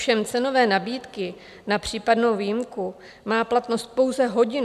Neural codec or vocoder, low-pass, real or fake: none; 14.4 kHz; real